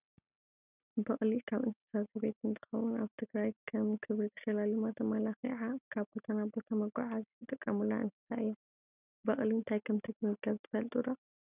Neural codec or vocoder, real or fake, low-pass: none; real; 3.6 kHz